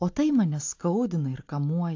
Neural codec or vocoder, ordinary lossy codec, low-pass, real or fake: none; AAC, 48 kbps; 7.2 kHz; real